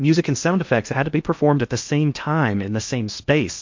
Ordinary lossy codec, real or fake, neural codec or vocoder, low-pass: MP3, 48 kbps; fake; codec, 16 kHz in and 24 kHz out, 0.8 kbps, FocalCodec, streaming, 65536 codes; 7.2 kHz